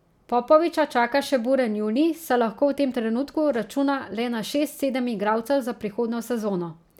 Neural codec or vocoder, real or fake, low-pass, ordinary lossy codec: none; real; 19.8 kHz; none